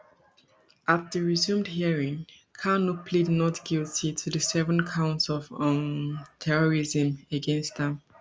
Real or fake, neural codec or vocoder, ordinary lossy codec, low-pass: real; none; none; none